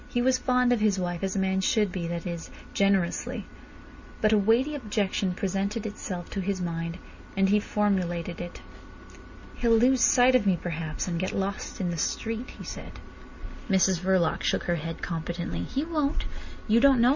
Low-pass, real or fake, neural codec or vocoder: 7.2 kHz; real; none